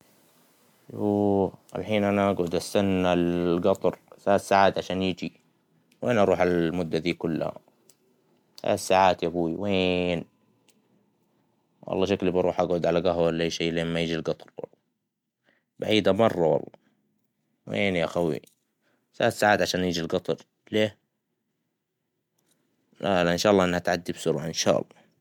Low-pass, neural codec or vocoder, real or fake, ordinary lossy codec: 19.8 kHz; none; real; MP3, 96 kbps